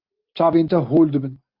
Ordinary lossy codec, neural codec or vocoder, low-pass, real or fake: Opus, 32 kbps; none; 5.4 kHz; real